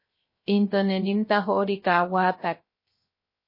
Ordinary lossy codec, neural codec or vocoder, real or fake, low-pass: MP3, 24 kbps; codec, 16 kHz, 0.3 kbps, FocalCodec; fake; 5.4 kHz